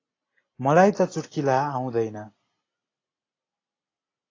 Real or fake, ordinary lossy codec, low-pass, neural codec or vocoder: real; AAC, 32 kbps; 7.2 kHz; none